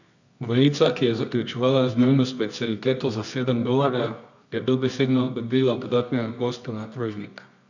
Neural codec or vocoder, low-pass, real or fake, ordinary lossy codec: codec, 24 kHz, 0.9 kbps, WavTokenizer, medium music audio release; 7.2 kHz; fake; none